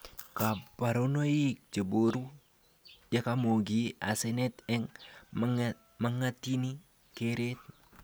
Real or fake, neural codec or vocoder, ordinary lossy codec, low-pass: real; none; none; none